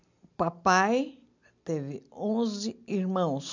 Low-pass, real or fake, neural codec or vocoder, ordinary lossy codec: 7.2 kHz; real; none; none